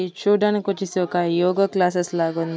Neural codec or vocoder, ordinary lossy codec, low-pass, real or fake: none; none; none; real